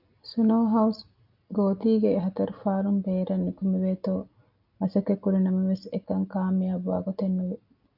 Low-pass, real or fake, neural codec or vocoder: 5.4 kHz; real; none